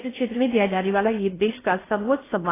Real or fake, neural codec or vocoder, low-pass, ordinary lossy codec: fake; codec, 16 kHz in and 24 kHz out, 0.6 kbps, FocalCodec, streaming, 4096 codes; 3.6 kHz; AAC, 16 kbps